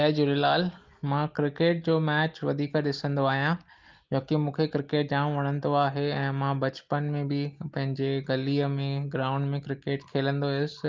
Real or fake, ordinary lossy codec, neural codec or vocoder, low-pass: real; Opus, 32 kbps; none; 7.2 kHz